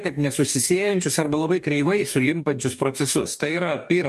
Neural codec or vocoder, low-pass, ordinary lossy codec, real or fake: codec, 44.1 kHz, 2.6 kbps, DAC; 14.4 kHz; MP3, 96 kbps; fake